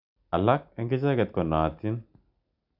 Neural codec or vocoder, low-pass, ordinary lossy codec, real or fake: none; 5.4 kHz; none; real